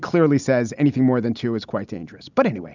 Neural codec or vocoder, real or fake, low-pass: none; real; 7.2 kHz